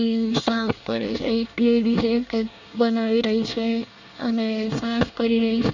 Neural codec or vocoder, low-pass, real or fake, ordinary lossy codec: codec, 24 kHz, 1 kbps, SNAC; 7.2 kHz; fake; none